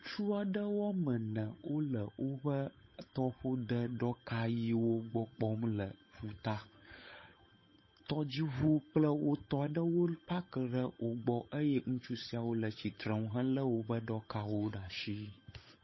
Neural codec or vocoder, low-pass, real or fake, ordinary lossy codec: codec, 16 kHz, 8 kbps, FunCodec, trained on Chinese and English, 25 frames a second; 7.2 kHz; fake; MP3, 24 kbps